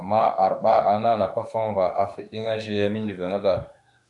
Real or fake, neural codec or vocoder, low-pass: fake; autoencoder, 48 kHz, 32 numbers a frame, DAC-VAE, trained on Japanese speech; 10.8 kHz